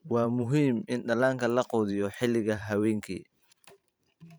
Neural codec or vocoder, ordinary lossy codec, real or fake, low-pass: vocoder, 44.1 kHz, 128 mel bands every 512 samples, BigVGAN v2; none; fake; none